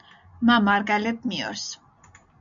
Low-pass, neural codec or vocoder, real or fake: 7.2 kHz; none; real